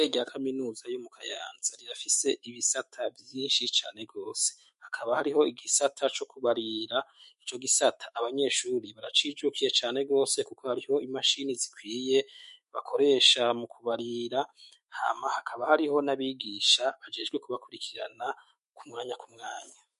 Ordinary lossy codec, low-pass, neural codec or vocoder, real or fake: MP3, 48 kbps; 14.4 kHz; autoencoder, 48 kHz, 128 numbers a frame, DAC-VAE, trained on Japanese speech; fake